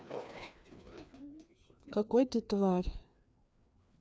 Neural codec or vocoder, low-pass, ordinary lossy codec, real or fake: codec, 16 kHz, 2 kbps, FreqCodec, larger model; none; none; fake